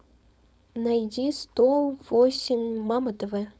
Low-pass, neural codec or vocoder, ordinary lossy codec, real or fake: none; codec, 16 kHz, 4.8 kbps, FACodec; none; fake